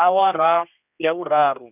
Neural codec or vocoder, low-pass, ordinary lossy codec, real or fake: codec, 16 kHz, 1 kbps, X-Codec, HuBERT features, trained on general audio; 3.6 kHz; none; fake